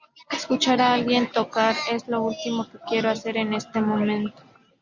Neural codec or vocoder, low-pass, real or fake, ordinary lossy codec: none; 7.2 kHz; real; Opus, 64 kbps